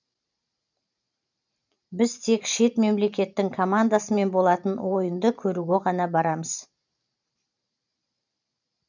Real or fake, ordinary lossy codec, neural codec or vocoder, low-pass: fake; none; vocoder, 44.1 kHz, 128 mel bands every 512 samples, BigVGAN v2; 7.2 kHz